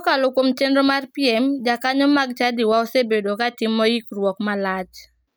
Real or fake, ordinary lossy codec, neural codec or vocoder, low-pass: real; none; none; none